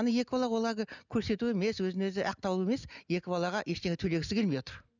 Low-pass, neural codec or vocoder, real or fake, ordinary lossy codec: 7.2 kHz; none; real; none